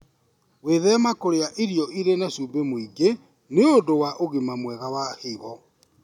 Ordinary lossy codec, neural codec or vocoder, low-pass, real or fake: none; none; 19.8 kHz; real